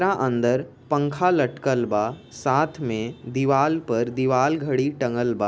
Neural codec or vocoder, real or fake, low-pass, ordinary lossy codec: none; real; none; none